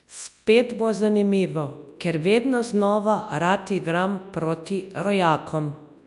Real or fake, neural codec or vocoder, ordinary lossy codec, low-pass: fake; codec, 24 kHz, 0.9 kbps, WavTokenizer, large speech release; none; 10.8 kHz